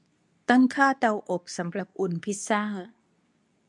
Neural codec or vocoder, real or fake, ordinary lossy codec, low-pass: codec, 24 kHz, 0.9 kbps, WavTokenizer, medium speech release version 2; fake; none; 10.8 kHz